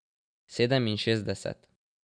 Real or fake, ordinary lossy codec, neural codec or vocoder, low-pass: real; none; none; 9.9 kHz